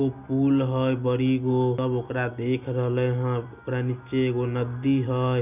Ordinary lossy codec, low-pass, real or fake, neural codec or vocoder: none; 3.6 kHz; real; none